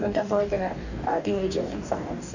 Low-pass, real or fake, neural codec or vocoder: 7.2 kHz; fake; codec, 44.1 kHz, 2.6 kbps, DAC